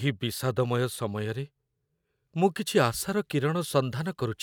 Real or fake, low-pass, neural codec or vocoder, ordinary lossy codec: real; none; none; none